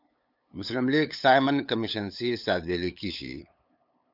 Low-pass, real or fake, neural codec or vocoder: 5.4 kHz; fake; codec, 16 kHz, 8 kbps, FunCodec, trained on LibriTTS, 25 frames a second